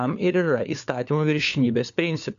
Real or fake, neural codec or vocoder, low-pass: fake; codec, 16 kHz, 4 kbps, FunCodec, trained on LibriTTS, 50 frames a second; 7.2 kHz